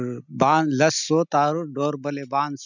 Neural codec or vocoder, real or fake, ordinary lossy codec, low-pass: none; real; none; 7.2 kHz